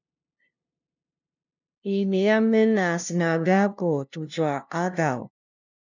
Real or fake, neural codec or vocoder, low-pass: fake; codec, 16 kHz, 0.5 kbps, FunCodec, trained on LibriTTS, 25 frames a second; 7.2 kHz